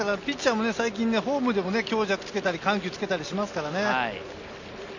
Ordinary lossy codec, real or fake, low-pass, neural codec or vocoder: none; real; 7.2 kHz; none